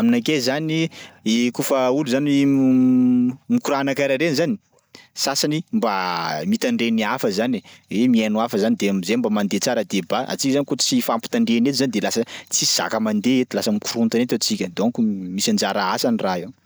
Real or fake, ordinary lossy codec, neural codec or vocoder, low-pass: real; none; none; none